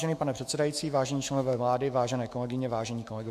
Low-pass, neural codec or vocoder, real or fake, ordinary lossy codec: 14.4 kHz; autoencoder, 48 kHz, 128 numbers a frame, DAC-VAE, trained on Japanese speech; fake; MP3, 64 kbps